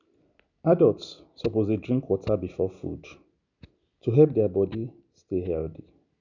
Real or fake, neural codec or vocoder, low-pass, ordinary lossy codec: real; none; 7.2 kHz; none